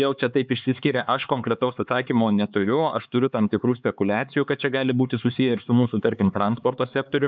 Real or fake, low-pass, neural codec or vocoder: fake; 7.2 kHz; codec, 16 kHz, 4 kbps, X-Codec, HuBERT features, trained on LibriSpeech